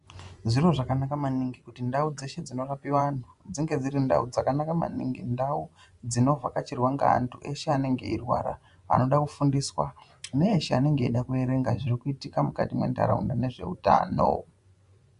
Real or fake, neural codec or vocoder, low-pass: fake; vocoder, 24 kHz, 100 mel bands, Vocos; 10.8 kHz